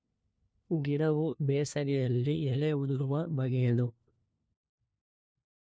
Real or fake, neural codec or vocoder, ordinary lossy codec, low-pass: fake; codec, 16 kHz, 1 kbps, FunCodec, trained on LibriTTS, 50 frames a second; none; none